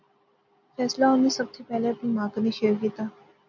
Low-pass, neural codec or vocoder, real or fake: 7.2 kHz; none; real